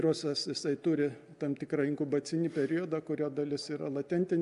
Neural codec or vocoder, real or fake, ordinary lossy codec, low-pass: none; real; MP3, 96 kbps; 10.8 kHz